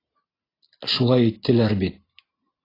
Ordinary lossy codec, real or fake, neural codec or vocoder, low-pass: AAC, 24 kbps; real; none; 5.4 kHz